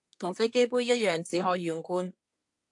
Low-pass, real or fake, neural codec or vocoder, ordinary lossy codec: 10.8 kHz; fake; codec, 24 kHz, 1 kbps, SNAC; AAC, 64 kbps